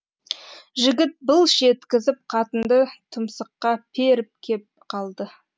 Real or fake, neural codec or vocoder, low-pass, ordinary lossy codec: real; none; none; none